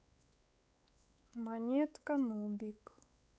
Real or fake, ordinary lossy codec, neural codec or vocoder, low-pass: fake; none; codec, 16 kHz, 4 kbps, X-Codec, WavLM features, trained on Multilingual LibriSpeech; none